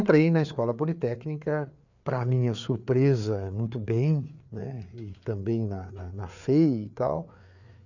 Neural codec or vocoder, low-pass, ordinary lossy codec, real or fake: codec, 16 kHz, 4 kbps, FreqCodec, larger model; 7.2 kHz; none; fake